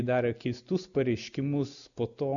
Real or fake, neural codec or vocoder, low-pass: real; none; 7.2 kHz